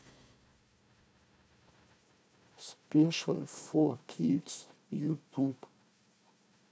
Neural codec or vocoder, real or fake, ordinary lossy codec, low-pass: codec, 16 kHz, 1 kbps, FunCodec, trained on Chinese and English, 50 frames a second; fake; none; none